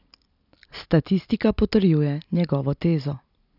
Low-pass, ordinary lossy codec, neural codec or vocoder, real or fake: 5.4 kHz; none; vocoder, 44.1 kHz, 128 mel bands every 256 samples, BigVGAN v2; fake